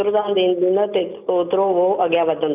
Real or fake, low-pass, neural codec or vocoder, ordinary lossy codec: real; 3.6 kHz; none; none